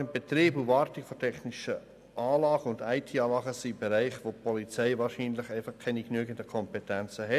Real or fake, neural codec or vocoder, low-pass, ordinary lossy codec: real; none; 14.4 kHz; none